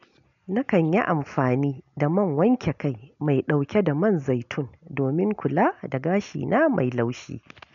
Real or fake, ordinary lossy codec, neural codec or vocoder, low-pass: real; none; none; 7.2 kHz